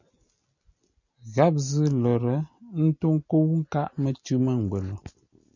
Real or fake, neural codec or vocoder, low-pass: real; none; 7.2 kHz